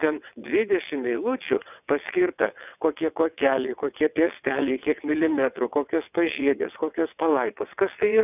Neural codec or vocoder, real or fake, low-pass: vocoder, 22.05 kHz, 80 mel bands, WaveNeXt; fake; 3.6 kHz